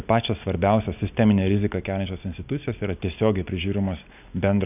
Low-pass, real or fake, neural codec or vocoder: 3.6 kHz; real; none